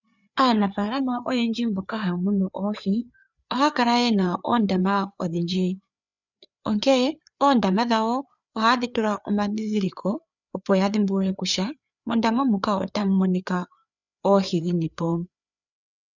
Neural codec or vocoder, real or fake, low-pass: codec, 16 kHz, 4 kbps, FreqCodec, larger model; fake; 7.2 kHz